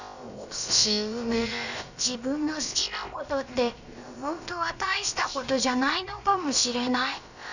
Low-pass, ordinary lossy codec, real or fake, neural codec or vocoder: 7.2 kHz; none; fake; codec, 16 kHz, about 1 kbps, DyCAST, with the encoder's durations